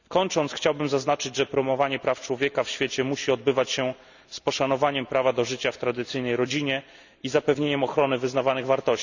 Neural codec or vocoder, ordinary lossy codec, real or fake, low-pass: none; none; real; 7.2 kHz